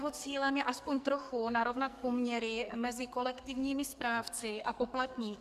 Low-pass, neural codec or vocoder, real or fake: 14.4 kHz; codec, 44.1 kHz, 2.6 kbps, SNAC; fake